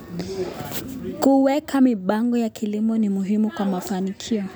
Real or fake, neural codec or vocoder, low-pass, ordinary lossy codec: real; none; none; none